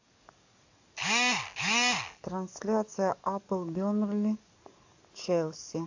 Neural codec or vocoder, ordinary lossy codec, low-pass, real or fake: codec, 44.1 kHz, 7.8 kbps, DAC; AAC, 48 kbps; 7.2 kHz; fake